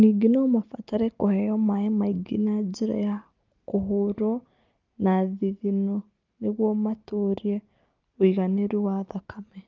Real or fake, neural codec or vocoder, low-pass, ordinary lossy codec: real; none; 7.2 kHz; Opus, 32 kbps